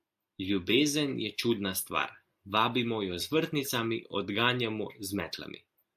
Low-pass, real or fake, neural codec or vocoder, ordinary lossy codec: 14.4 kHz; real; none; AAC, 96 kbps